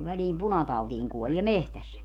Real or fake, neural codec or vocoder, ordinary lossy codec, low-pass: fake; codec, 44.1 kHz, 7.8 kbps, DAC; none; 19.8 kHz